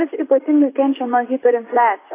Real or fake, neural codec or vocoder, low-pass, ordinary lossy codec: fake; vocoder, 44.1 kHz, 128 mel bands, Pupu-Vocoder; 3.6 kHz; AAC, 24 kbps